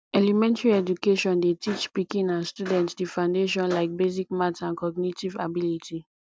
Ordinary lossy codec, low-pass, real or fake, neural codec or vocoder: none; none; real; none